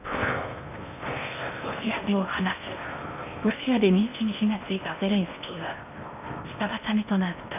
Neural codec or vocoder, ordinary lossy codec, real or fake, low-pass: codec, 16 kHz in and 24 kHz out, 0.6 kbps, FocalCodec, streaming, 4096 codes; none; fake; 3.6 kHz